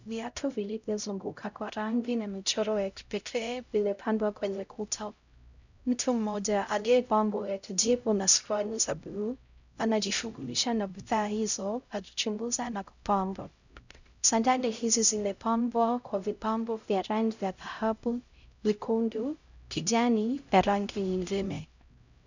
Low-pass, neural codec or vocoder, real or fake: 7.2 kHz; codec, 16 kHz, 0.5 kbps, X-Codec, HuBERT features, trained on LibriSpeech; fake